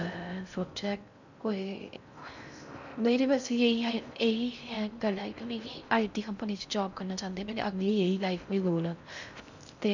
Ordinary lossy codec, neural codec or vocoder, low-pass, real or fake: none; codec, 16 kHz in and 24 kHz out, 0.6 kbps, FocalCodec, streaming, 4096 codes; 7.2 kHz; fake